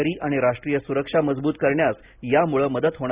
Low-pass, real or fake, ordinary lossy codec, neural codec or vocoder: 3.6 kHz; real; Opus, 64 kbps; none